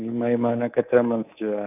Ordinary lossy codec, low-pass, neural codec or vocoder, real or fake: none; 3.6 kHz; codec, 24 kHz, 3.1 kbps, DualCodec; fake